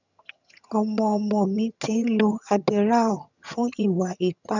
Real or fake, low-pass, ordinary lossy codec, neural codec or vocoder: fake; 7.2 kHz; none; vocoder, 22.05 kHz, 80 mel bands, HiFi-GAN